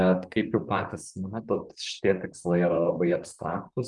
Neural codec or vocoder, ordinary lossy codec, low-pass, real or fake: vocoder, 44.1 kHz, 128 mel bands, Pupu-Vocoder; Opus, 32 kbps; 10.8 kHz; fake